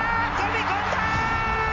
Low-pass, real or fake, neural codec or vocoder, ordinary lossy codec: 7.2 kHz; real; none; MP3, 64 kbps